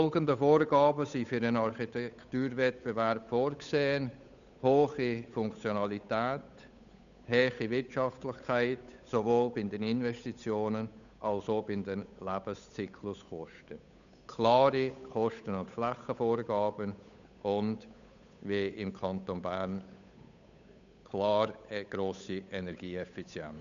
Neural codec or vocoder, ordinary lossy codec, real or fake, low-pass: codec, 16 kHz, 8 kbps, FunCodec, trained on Chinese and English, 25 frames a second; none; fake; 7.2 kHz